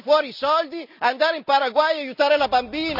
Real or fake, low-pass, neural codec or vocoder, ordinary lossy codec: real; 5.4 kHz; none; none